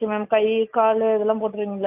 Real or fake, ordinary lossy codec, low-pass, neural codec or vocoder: real; AAC, 32 kbps; 3.6 kHz; none